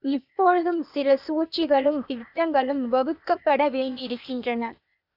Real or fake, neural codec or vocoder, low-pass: fake; codec, 16 kHz, 0.8 kbps, ZipCodec; 5.4 kHz